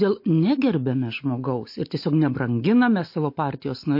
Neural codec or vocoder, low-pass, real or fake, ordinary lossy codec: none; 5.4 kHz; real; MP3, 32 kbps